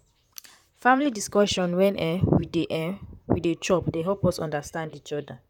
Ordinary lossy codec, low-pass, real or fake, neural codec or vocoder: none; 19.8 kHz; fake; vocoder, 44.1 kHz, 128 mel bands, Pupu-Vocoder